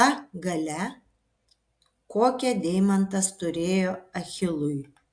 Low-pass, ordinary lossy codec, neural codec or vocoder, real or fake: 9.9 kHz; AAC, 64 kbps; none; real